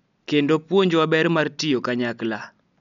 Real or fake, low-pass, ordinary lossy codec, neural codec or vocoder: real; 7.2 kHz; none; none